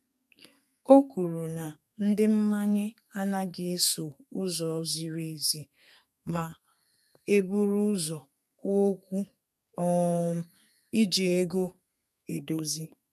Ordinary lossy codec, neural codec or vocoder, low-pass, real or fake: none; codec, 32 kHz, 1.9 kbps, SNAC; 14.4 kHz; fake